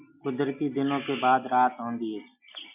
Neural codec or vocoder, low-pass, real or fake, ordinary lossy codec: none; 3.6 kHz; real; MP3, 32 kbps